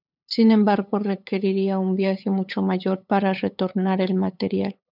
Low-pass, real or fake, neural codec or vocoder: 5.4 kHz; fake; codec, 16 kHz, 8 kbps, FunCodec, trained on LibriTTS, 25 frames a second